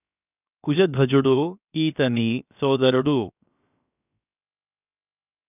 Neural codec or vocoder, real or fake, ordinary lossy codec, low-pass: codec, 16 kHz, 0.7 kbps, FocalCodec; fake; none; 3.6 kHz